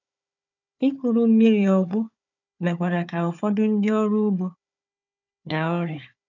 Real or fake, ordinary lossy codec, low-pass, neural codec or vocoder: fake; none; 7.2 kHz; codec, 16 kHz, 4 kbps, FunCodec, trained on Chinese and English, 50 frames a second